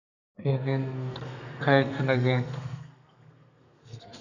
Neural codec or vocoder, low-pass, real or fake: codec, 32 kHz, 1.9 kbps, SNAC; 7.2 kHz; fake